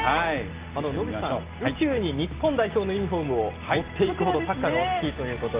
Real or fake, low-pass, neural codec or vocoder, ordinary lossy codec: real; 3.6 kHz; none; Opus, 32 kbps